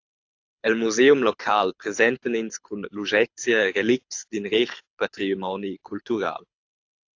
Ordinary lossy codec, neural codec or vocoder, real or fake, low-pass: AAC, 48 kbps; codec, 24 kHz, 6 kbps, HILCodec; fake; 7.2 kHz